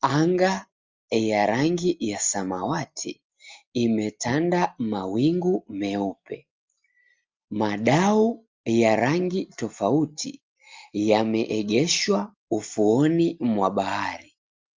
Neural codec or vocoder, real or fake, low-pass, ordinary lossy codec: none; real; 7.2 kHz; Opus, 32 kbps